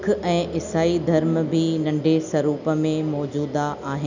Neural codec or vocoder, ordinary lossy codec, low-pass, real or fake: none; none; 7.2 kHz; real